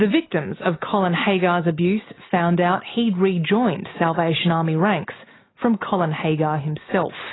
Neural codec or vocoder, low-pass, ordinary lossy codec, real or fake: none; 7.2 kHz; AAC, 16 kbps; real